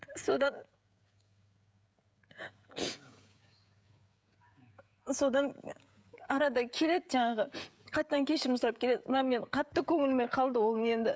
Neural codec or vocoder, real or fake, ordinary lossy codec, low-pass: codec, 16 kHz, 16 kbps, FreqCodec, smaller model; fake; none; none